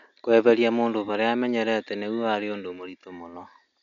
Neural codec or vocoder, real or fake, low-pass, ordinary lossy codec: none; real; 7.2 kHz; none